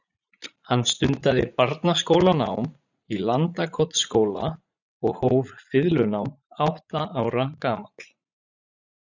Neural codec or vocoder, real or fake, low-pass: vocoder, 44.1 kHz, 80 mel bands, Vocos; fake; 7.2 kHz